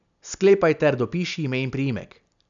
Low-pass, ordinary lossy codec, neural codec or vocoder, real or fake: 7.2 kHz; none; none; real